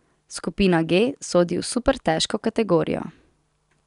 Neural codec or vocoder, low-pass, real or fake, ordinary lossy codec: none; 10.8 kHz; real; none